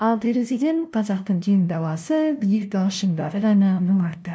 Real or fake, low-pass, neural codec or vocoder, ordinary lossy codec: fake; none; codec, 16 kHz, 0.5 kbps, FunCodec, trained on LibriTTS, 25 frames a second; none